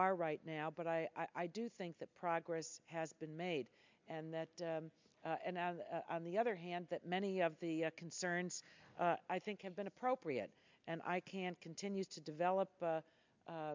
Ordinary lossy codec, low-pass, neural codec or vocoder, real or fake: MP3, 64 kbps; 7.2 kHz; none; real